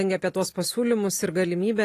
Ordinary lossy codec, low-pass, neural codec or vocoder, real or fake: AAC, 48 kbps; 14.4 kHz; none; real